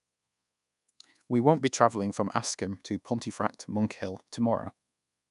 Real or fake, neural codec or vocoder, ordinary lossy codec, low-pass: fake; codec, 24 kHz, 1.2 kbps, DualCodec; none; 10.8 kHz